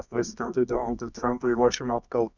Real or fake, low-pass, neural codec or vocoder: fake; 7.2 kHz; codec, 24 kHz, 0.9 kbps, WavTokenizer, medium music audio release